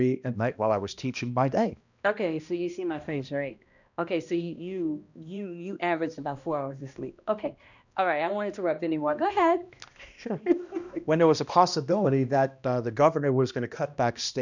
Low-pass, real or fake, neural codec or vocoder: 7.2 kHz; fake; codec, 16 kHz, 1 kbps, X-Codec, HuBERT features, trained on balanced general audio